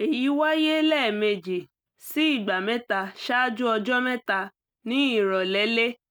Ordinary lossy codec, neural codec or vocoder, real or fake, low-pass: none; none; real; none